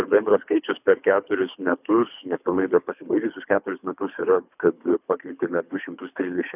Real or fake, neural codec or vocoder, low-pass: fake; codec, 24 kHz, 3 kbps, HILCodec; 3.6 kHz